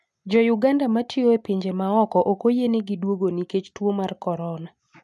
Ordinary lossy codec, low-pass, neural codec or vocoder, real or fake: none; none; none; real